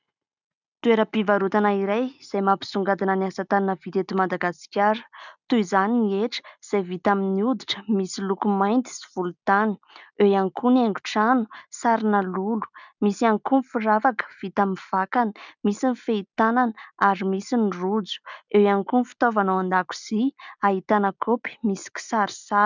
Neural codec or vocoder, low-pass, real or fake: none; 7.2 kHz; real